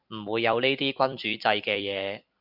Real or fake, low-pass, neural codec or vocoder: fake; 5.4 kHz; vocoder, 44.1 kHz, 128 mel bands every 256 samples, BigVGAN v2